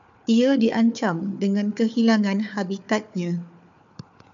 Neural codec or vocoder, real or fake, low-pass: codec, 16 kHz, 4 kbps, FunCodec, trained on Chinese and English, 50 frames a second; fake; 7.2 kHz